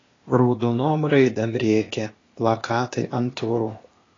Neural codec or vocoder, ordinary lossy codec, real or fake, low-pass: codec, 16 kHz, 0.8 kbps, ZipCodec; AAC, 32 kbps; fake; 7.2 kHz